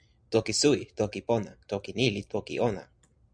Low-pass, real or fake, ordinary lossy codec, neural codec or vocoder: 9.9 kHz; real; MP3, 96 kbps; none